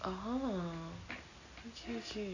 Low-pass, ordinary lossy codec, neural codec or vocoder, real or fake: 7.2 kHz; none; none; real